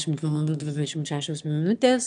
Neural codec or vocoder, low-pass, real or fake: autoencoder, 22.05 kHz, a latent of 192 numbers a frame, VITS, trained on one speaker; 9.9 kHz; fake